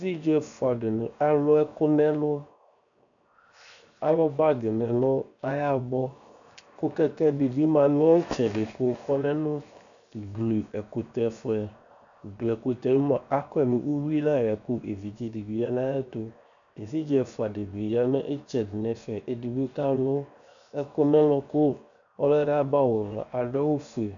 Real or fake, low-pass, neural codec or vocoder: fake; 7.2 kHz; codec, 16 kHz, 0.7 kbps, FocalCodec